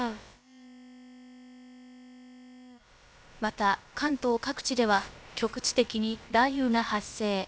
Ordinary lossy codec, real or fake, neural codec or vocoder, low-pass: none; fake; codec, 16 kHz, about 1 kbps, DyCAST, with the encoder's durations; none